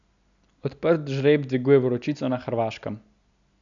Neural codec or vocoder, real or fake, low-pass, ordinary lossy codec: none; real; 7.2 kHz; none